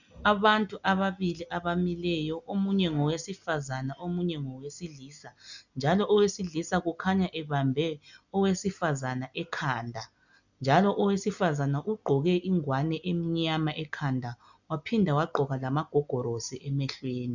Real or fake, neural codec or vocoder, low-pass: real; none; 7.2 kHz